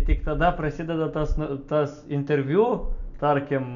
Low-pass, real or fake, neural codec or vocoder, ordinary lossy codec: 7.2 kHz; real; none; MP3, 96 kbps